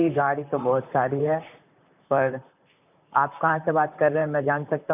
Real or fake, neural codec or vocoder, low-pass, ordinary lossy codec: fake; vocoder, 44.1 kHz, 128 mel bands, Pupu-Vocoder; 3.6 kHz; none